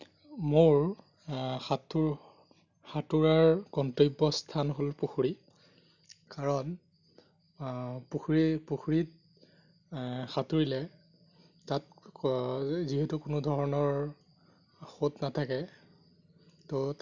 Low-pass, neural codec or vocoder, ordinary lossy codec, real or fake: 7.2 kHz; none; none; real